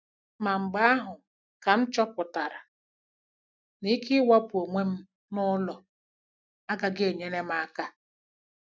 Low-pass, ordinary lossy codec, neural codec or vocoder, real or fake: none; none; none; real